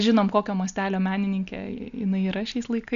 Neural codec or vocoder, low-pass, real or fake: none; 7.2 kHz; real